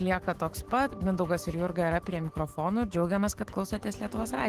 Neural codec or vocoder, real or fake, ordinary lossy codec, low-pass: autoencoder, 48 kHz, 128 numbers a frame, DAC-VAE, trained on Japanese speech; fake; Opus, 16 kbps; 14.4 kHz